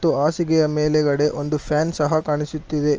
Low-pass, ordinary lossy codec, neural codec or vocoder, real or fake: 7.2 kHz; Opus, 24 kbps; none; real